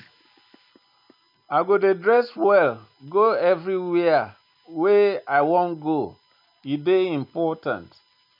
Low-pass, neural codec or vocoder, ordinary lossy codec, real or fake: 5.4 kHz; none; none; real